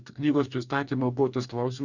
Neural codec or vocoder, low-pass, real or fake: codec, 44.1 kHz, 2.6 kbps, DAC; 7.2 kHz; fake